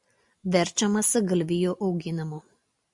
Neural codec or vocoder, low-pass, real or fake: none; 10.8 kHz; real